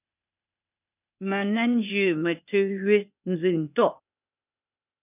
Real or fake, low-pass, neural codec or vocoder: fake; 3.6 kHz; codec, 16 kHz, 0.8 kbps, ZipCodec